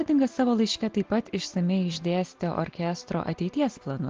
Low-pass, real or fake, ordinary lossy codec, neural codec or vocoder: 7.2 kHz; real; Opus, 16 kbps; none